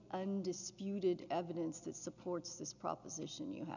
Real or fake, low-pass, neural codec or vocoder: real; 7.2 kHz; none